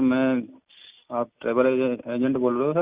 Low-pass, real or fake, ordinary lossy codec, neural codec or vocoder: 3.6 kHz; real; Opus, 64 kbps; none